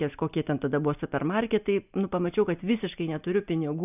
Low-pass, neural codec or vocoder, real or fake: 3.6 kHz; none; real